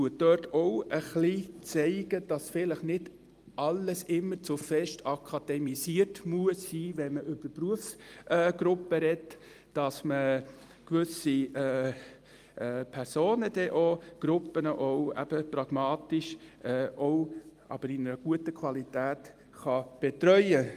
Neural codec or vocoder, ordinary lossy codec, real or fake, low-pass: none; Opus, 32 kbps; real; 14.4 kHz